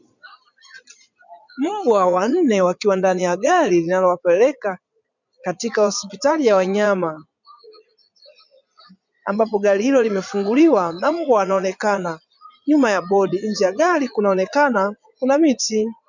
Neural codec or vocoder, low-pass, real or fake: vocoder, 22.05 kHz, 80 mel bands, Vocos; 7.2 kHz; fake